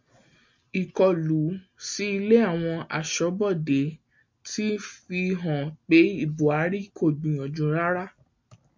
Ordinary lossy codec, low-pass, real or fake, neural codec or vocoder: MP3, 64 kbps; 7.2 kHz; real; none